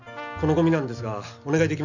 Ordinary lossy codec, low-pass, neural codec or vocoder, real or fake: none; 7.2 kHz; none; real